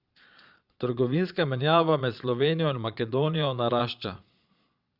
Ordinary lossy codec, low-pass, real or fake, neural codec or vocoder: Opus, 64 kbps; 5.4 kHz; fake; vocoder, 22.05 kHz, 80 mel bands, Vocos